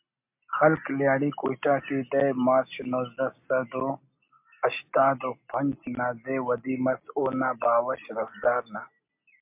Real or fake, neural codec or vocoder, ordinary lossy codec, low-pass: real; none; MP3, 32 kbps; 3.6 kHz